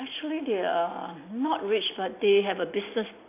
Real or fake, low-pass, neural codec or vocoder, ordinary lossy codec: real; 3.6 kHz; none; AAC, 24 kbps